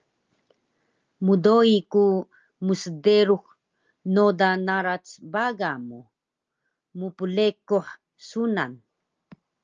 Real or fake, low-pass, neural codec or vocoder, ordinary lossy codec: real; 7.2 kHz; none; Opus, 24 kbps